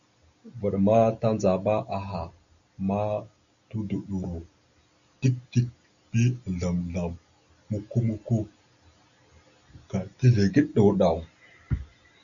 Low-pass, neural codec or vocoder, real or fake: 7.2 kHz; none; real